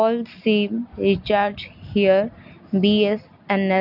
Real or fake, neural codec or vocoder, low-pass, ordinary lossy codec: real; none; 5.4 kHz; none